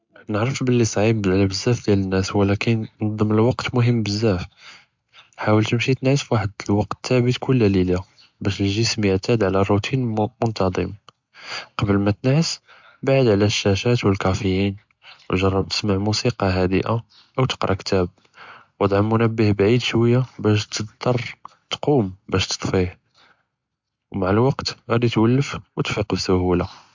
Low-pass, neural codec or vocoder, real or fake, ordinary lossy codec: 7.2 kHz; none; real; MP3, 64 kbps